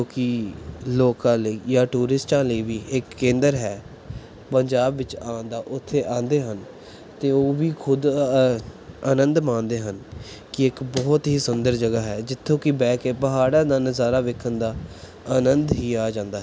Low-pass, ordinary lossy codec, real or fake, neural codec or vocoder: none; none; real; none